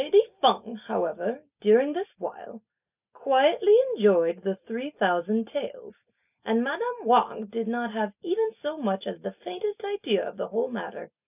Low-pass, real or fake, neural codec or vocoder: 3.6 kHz; real; none